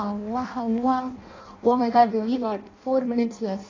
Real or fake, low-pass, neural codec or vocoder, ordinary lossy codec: fake; 7.2 kHz; codec, 16 kHz in and 24 kHz out, 0.6 kbps, FireRedTTS-2 codec; AAC, 32 kbps